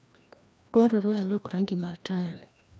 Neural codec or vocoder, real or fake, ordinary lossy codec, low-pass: codec, 16 kHz, 1 kbps, FreqCodec, larger model; fake; none; none